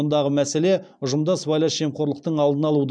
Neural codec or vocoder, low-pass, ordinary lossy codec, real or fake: none; 9.9 kHz; none; real